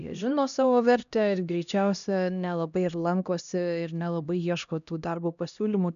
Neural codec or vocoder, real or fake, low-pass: codec, 16 kHz, 1 kbps, X-Codec, HuBERT features, trained on LibriSpeech; fake; 7.2 kHz